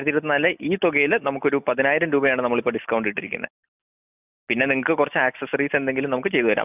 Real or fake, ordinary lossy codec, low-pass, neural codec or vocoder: real; none; 3.6 kHz; none